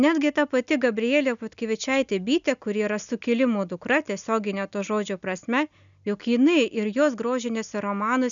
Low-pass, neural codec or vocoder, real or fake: 7.2 kHz; none; real